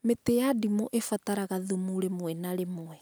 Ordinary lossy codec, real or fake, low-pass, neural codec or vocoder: none; real; none; none